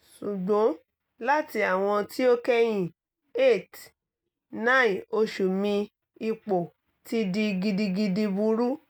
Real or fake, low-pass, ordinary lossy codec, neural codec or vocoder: real; none; none; none